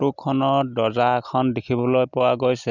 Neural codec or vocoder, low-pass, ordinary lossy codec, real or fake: none; 7.2 kHz; none; real